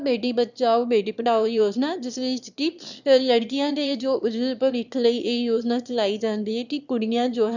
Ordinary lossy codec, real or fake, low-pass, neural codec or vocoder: none; fake; 7.2 kHz; autoencoder, 22.05 kHz, a latent of 192 numbers a frame, VITS, trained on one speaker